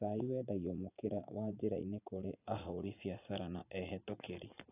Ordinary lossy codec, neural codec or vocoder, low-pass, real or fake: AAC, 32 kbps; vocoder, 44.1 kHz, 80 mel bands, Vocos; 3.6 kHz; fake